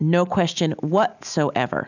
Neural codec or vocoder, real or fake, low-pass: none; real; 7.2 kHz